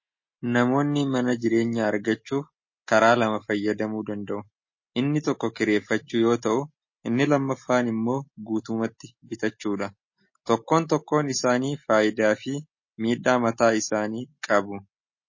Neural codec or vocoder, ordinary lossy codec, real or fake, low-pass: none; MP3, 32 kbps; real; 7.2 kHz